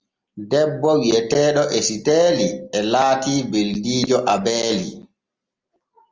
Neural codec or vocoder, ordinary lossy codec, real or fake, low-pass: none; Opus, 32 kbps; real; 7.2 kHz